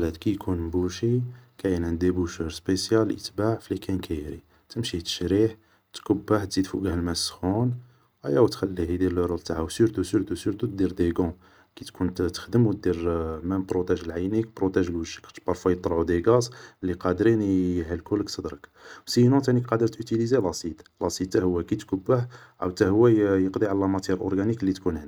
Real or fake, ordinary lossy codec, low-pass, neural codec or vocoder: real; none; none; none